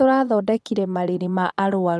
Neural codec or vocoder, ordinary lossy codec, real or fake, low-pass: vocoder, 22.05 kHz, 80 mel bands, Vocos; none; fake; none